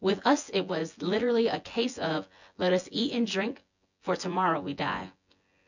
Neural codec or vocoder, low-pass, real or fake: vocoder, 24 kHz, 100 mel bands, Vocos; 7.2 kHz; fake